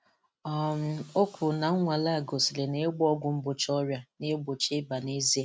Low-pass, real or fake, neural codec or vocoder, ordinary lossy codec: none; real; none; none